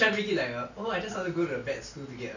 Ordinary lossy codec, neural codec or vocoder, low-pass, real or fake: none; none; 7.2 kHz; real